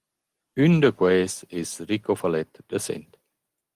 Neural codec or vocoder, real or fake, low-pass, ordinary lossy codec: none; real; 14.4 kHz; Opus, 24 kbps